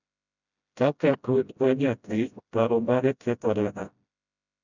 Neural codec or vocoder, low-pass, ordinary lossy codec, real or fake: codec, 16 kHz, 0.5 kbps, FreqCodec, smaller model; 7.2 kHz; none; fake